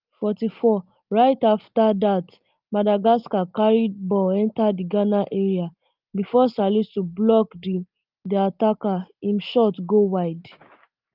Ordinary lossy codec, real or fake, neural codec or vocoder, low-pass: Opus, 32 kbps; real; none; 5.4 kHz